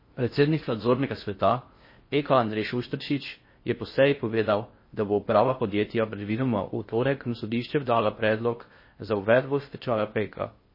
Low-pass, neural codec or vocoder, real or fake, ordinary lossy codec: 5.4 kHz; codec, 16 kHz in and 24 kHz out, 0.6 kbps, FocalCodec, streaming, 4096 codes; fake; MP3, 24 kbps